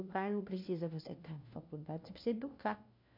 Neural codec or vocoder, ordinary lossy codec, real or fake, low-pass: codec, 16 kHz, 1 kbps, FunCodec, trained on LibriTTS, 50 frames a second; MP3, 48 kbps; fake; 5.4 kHz